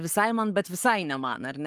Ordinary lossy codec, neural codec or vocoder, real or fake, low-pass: Opus, 32 kbps; codec, 44.1 kHz, 7.8 kbps, Pupu-Codec; fake; 14.4 kHz